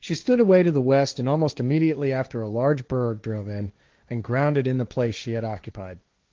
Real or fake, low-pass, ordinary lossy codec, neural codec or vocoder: fake; 7.2 kHz; Opus, 32 kbps; codec, 16 kHz, 1.1 kbps, Voila-Tokenizer